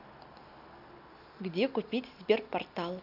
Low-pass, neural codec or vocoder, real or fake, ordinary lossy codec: 5.4 kHz; none; real; none